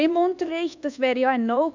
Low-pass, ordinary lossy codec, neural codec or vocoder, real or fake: 7.2 kHz; none; codec, 24 kHz, 1.2 kbps, DualCodec; fake